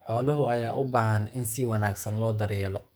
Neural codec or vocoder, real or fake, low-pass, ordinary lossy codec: codec, 44.1 kHz, 2.6 kbps, SNAC; fake; none; none